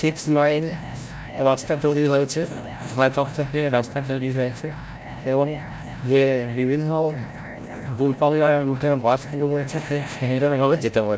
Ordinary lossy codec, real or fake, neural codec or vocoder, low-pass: none; fake; codec, 16 kHz, 0.5 kbps, FreqCodec, larger model; none